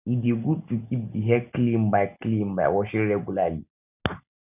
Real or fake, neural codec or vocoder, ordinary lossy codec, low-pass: real; none; none; 3.6 kHz